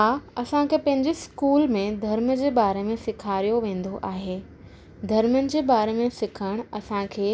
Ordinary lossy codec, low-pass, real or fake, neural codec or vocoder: none; none; real; none